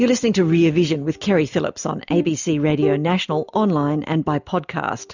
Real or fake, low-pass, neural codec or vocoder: real; 7.2 kHz; none